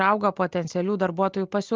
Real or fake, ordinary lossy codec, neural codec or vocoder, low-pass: real; Opus, 24 kbps; none; 7.2 kHz